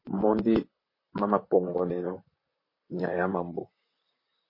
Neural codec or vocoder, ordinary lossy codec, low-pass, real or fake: vocoder, 44.1 kHz, 128 mel bands, Pupu-Vocoder; MP3, 24 kbps; 5.4 kHz; fake